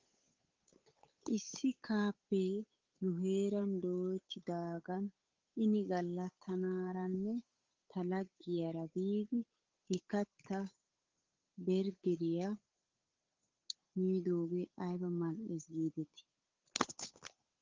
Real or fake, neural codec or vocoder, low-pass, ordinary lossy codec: fake; codec, 16 kHz, 8 kbps, FunCodec, trained on Chinese and English, 25 frames a second; 7.2 kHz; Opus, 32 kbps